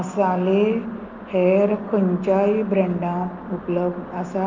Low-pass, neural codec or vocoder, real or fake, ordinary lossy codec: 7.2 kHz; none; real; Opus, 24 kbps